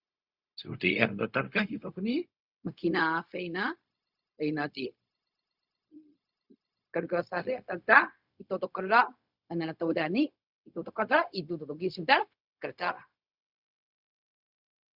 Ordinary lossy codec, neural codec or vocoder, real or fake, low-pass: Opus, 64 kbps; codec, 16 kHz, 0.4 kbps, LongCat-Audio-Codec; fake; 5.4 kHz